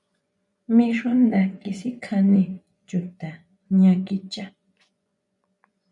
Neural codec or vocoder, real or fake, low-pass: vocoder, 24 kHz, 100 mel bands, Vocos; fake; 10.8 kHz